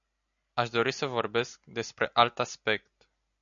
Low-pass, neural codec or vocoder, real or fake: 7.2 kHz; none; real